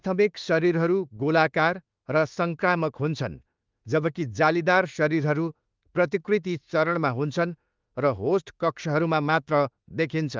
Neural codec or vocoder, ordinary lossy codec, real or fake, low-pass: autoencoder, 48 kHz, 32 numbers a frame, DAC-VAE, trained on Japanese speech; Opus, 24 kbps; fake; 7.2 kHz